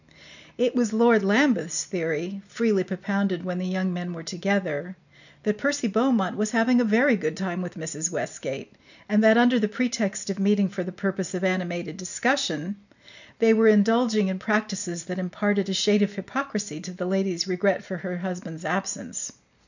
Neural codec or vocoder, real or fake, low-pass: none; real; 7.2 kHz